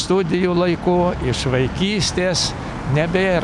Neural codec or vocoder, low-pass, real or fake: none; 10.8 kHz; real